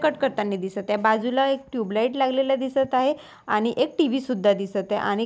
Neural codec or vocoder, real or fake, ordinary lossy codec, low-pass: none; real; none; none